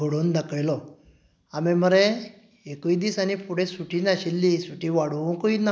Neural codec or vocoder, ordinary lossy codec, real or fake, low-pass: none; none; real; none